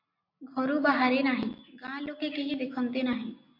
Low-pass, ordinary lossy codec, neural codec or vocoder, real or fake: 5.4 kHz; MP3, 32 kbps; none; real